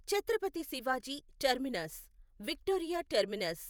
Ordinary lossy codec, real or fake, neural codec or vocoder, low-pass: none; fake; vocoder, 48 kHz, 128 mel bands, Vocos; none